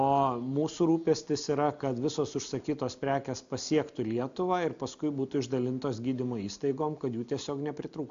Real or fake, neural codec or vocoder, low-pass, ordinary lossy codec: real; none; 7.2 kHz; MP3, 64 kbps